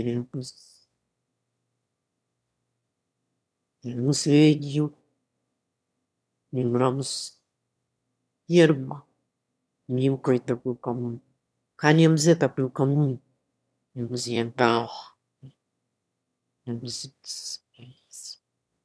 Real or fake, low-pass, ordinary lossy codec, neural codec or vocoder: fake; none; none; autoencoder, 22.05 kHz, a latent of 192 numbers a frame, VITS, trained on one speaker